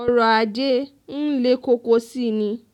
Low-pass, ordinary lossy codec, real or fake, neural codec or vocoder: 19.8 kHz; none; real; none